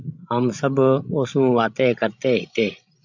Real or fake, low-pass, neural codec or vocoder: fake; 7.2 kHz; codec, 16 kHz, 16 kbps, FreqCodec, larger model